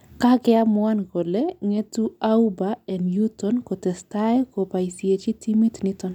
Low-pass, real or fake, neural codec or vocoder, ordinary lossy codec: 19.8 kHz; real; none; none